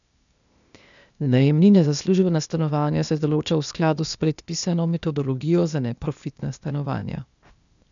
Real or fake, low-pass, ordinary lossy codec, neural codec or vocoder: fake; 7.2 kHz; none; codec, 16 kHz, 0.8 kbps, ZipCodec